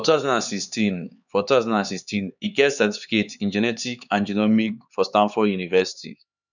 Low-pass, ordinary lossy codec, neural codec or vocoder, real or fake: 7.2 kHz; none; codec, 16 kHz, 4 kbps, X-Codec, HuBERT features, trained on LibriSpeech; fake